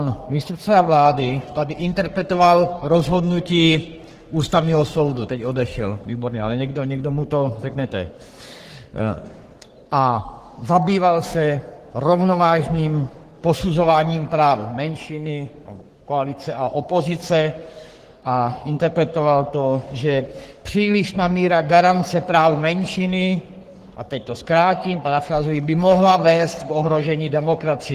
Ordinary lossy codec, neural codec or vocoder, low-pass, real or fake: Opus, 16 kbps; codec, 44.1 kHz, 3.4 kbps, Pupu-Codec; 14.4 kHz; fake